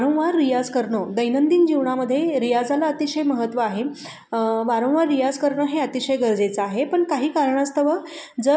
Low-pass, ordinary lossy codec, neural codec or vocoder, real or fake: none; none; none; real